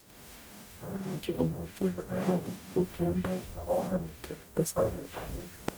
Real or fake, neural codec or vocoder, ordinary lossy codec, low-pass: fake; codec, 44.1 kHz, 0.9 kbps, DAC; none; none